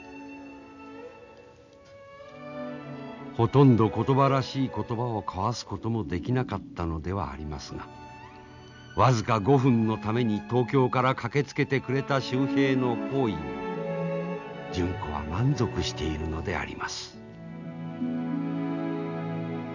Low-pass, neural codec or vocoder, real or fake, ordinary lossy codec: 7.2 kHz; none; real; none